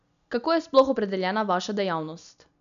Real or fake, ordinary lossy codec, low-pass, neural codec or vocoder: real; none; 7.2 kHz; none